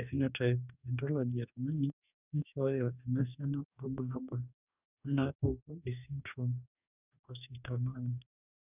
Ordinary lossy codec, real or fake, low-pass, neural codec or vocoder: none; fake; 3.6 kHz; codec, 44.1 kHz, 2.6 kbps, DAC